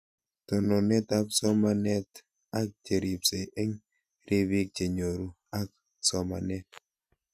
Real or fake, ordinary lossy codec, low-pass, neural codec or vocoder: real; none; 14.4 kHz; none